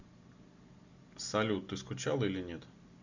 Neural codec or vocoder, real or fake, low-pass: none; real; 7.2 kHz